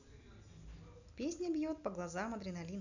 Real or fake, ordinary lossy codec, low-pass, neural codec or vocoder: real; none; 7.2 kHz; none